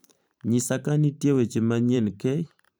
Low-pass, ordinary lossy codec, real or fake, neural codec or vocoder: none; none; fake; vocoder, 44.1 kHz, 128 mel bands every 512 samples, BigVGAN v2